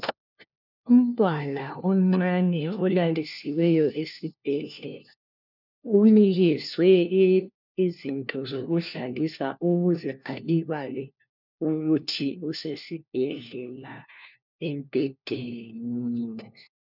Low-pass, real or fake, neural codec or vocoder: 5.4 kHz; fake; codec, 16 kHz, 1 kbps, FunCodec, trained on LibriTTS, 50 frames a second